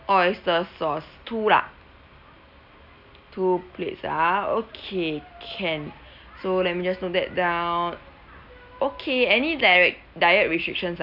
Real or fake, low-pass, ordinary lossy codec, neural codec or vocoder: real; 5.4 kHz; none; none